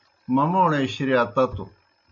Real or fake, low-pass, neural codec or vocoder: real; 7.2 kHz; none